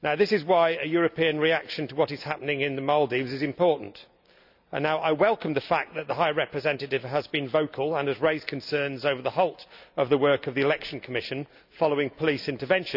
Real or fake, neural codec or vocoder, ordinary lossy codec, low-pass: real; none; none; 5.4 kHz